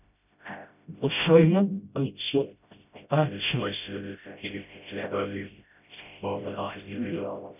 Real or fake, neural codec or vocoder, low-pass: fake; codec, 16 kHz, 0.5 kbps, FreqCodec, smaller model; 3.6 kHz